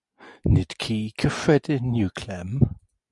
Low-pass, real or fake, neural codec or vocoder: 10.8 kHz; real; none